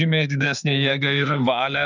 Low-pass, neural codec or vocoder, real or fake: 7.2 kHz; autoencoder, 48 kHz, 32 numbers a frame, DAC-VAE, trained on Japanese speech; fake